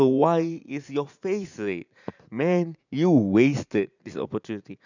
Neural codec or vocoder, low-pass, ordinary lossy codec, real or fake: vocoder, 44.1 kHz, 80 mel bands, Vocos; 7.2 kHz; none; fake